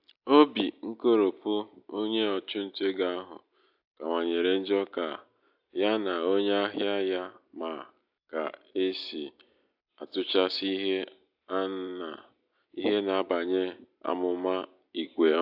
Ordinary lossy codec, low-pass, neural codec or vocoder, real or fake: AAC, 48 kbps; 5.4 kHz; none; real